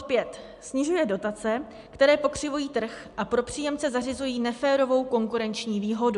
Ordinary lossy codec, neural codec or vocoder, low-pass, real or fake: AAC, 96 kbps; none; 10.8 kHz; real